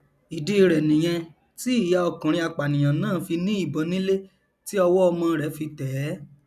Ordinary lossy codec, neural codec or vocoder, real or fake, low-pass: none; none; real; 14.4 kHz